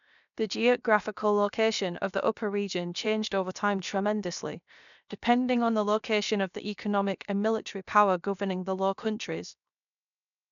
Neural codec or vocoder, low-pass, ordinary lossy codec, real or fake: codec, 16 kHz, 0.7 kbps, FocalCodec; 7.2 kHz; none; fake